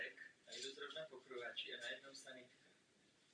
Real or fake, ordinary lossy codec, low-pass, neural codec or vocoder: real; Opus, 64 kbps; 9.9 kHz; none